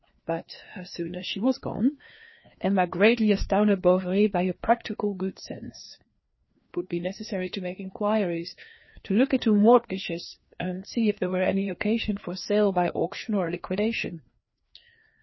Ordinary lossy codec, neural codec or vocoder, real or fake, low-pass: MP3, 24 kbps; codec, 16 kHz, 2 kbps, FreqCodec, larger model; fake; 7.2 kHz